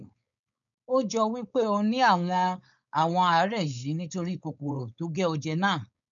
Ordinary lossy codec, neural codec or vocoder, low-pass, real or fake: none; codec, 16 kHz, 4.8 kbps, FACodec; 7.2 kHz; fake